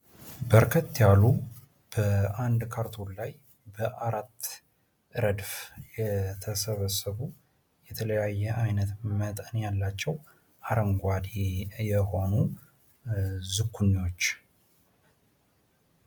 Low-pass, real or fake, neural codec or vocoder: 19.8 kHz; real; none